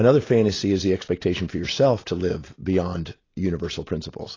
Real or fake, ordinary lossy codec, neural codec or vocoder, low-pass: real; AAC, 32 kbps; none; 7.2 kHz